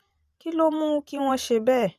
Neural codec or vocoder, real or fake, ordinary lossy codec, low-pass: vocoder, 44.1 kHz, 128 mel bands every 512 samples, BigVGAN v2; fake; MP3, 96 kbps; 14.4 kHz